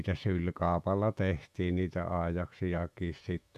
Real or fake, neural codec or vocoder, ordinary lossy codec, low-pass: fake; autoencoder, 48 kHz, 128 numbers a frame, DAC-VAE, trained on Japanese speech; none; 14.4 kHz